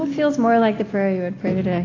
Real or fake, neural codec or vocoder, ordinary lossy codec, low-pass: real; none; AAC, 32 kbps; 7.2 kHz